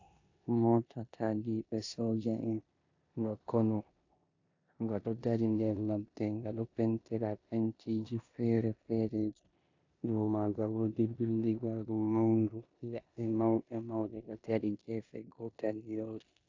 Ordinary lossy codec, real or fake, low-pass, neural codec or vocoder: AAC, 48 kbps; fake; 7.2 kHz; codec, 16 kHz in and 24 kHz out, 0.9 kbps, LongCat-Audio-Codec, four codebook decoder